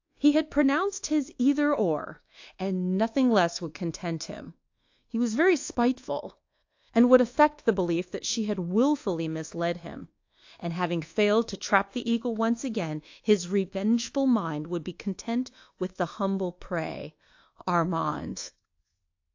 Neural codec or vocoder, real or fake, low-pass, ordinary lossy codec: codec, 24 kHz, 1.2 kbps, DualCodec; fake; 7.2 kHz; AAC, 48 kbps